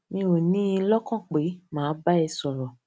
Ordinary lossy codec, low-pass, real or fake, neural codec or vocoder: none; none; real; none